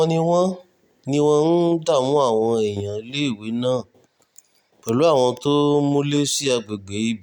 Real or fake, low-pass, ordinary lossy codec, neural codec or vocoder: real; 19.8 kHz; none; none